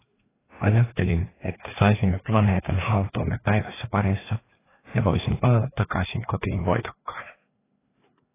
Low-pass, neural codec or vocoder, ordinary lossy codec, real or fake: 3.6 kHz; codec, 16 kHz, 2 kbps, FreqCodec, larger model; AAC, 16 kbps; fake